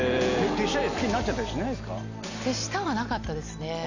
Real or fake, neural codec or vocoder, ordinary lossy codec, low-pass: real; none; none; 7.2 kHz